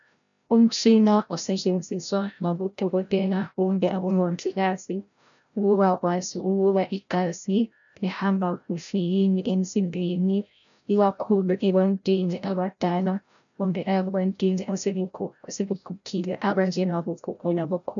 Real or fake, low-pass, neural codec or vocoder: fake; 7.2 kHz; codec, 16 kHz, 0.5 kbps, FreqCodec, larger model